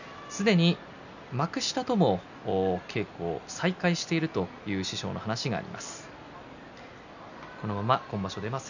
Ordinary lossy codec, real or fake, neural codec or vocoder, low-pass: none; real; none; 7.2 kHz